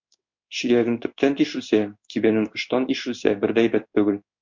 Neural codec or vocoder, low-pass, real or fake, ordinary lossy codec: codec, 16 kHz in and 24 kHz out, 1 kbps, XY-Tokenizer; 7.2 kHz; fake; MP3, 48 kbps